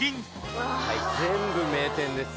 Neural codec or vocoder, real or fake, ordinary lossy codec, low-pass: none; real; none; none